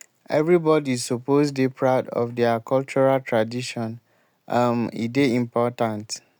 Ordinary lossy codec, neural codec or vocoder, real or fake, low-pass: none; none; real; none